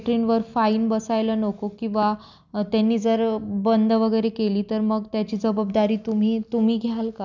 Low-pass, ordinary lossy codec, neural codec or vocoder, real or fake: 7.2 kHz; none; none; real